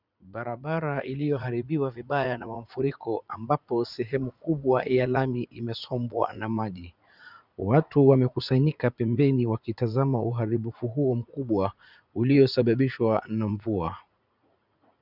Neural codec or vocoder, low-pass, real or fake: vocoder, 44.1 kHz, 80 mel bands, Vocos; 5.4 kHz; fake